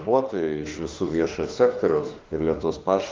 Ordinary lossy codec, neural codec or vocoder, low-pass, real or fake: Opus, 32 kbps; autoencoder, 48 kHz, 32 numbers a frame, DAC-VAE, trained on Japanese speech; 7.2 kHz; fake